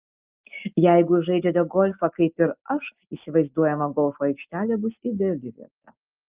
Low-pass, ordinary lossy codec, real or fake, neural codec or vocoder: 3.6 kHz; Opus, 24 kbps; real; none